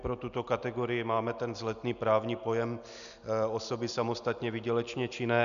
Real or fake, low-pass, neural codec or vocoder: real; 7.2 kHz; none